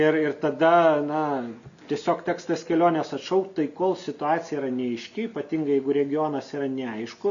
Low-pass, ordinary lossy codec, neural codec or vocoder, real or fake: 7.2 kHz; MP3, 96 kbps; none; real